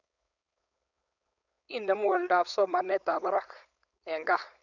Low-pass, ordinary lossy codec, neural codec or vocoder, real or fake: 7.2 kHz; none; codec, 16 kHz, 4.8 kbps, FACodec; fake